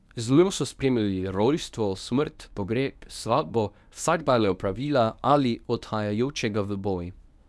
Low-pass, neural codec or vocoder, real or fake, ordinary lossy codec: none; codec, 24 kHz, 0.9 kbps, WavTokenizer, medium speech release version 1; fake; none